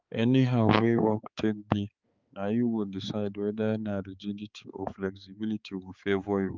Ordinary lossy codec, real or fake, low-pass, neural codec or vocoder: Opus, 32 kbps; fake; 7.2 kHz; codec, 16 kHz, 4 kbps, X-Codec, HuBERT features, trained on balanced general audio